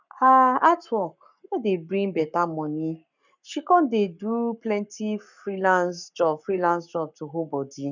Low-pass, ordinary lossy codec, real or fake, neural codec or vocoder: 7.2 kHz; none; fake; codec, 44.1 kHz, 7.8 kbps, Pupu-Codec